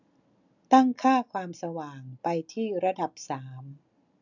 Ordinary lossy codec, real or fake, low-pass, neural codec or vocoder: none; real; 7.2 kHz; none